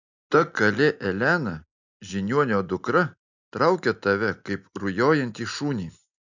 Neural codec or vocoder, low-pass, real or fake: none; 7.2 kHz; real